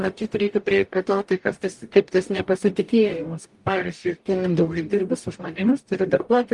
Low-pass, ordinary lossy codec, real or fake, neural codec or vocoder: 10.8 kHz; Opus, 32 kbps; fake; codec, 44.1 kHz, 0.9 kbps, DAC